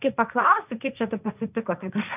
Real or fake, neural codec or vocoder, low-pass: fake; codec, 16 kHz, 1.1 kbps, Voila-Tokenizer; 3.6 kHz